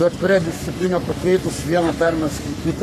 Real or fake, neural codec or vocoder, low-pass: fake; codec, 44.1 kHz, 3.4 kbps, Pupu-Codec; 14.4 kHz